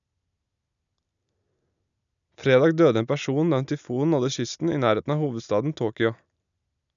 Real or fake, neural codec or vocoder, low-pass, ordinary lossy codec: real; none; 7.2 kHz; none